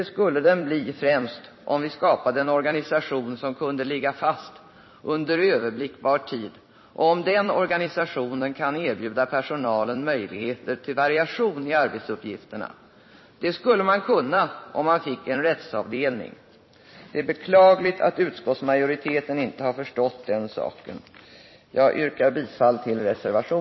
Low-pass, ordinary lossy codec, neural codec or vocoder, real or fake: 7.2 kHz; MP3, 24 kbps; vocoder, 44.1 kHz, 128 mel bands every 256 samples, BigVGAN v2; fake